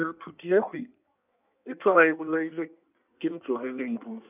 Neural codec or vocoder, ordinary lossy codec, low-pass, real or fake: codec, 16 kHz in and 24 kHz out, 1.1 kbps, FireRedTTS-2 codec; none; 3.6 kHz; fake